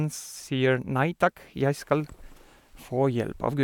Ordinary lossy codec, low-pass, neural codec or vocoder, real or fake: none; 19.8 kHz; none; real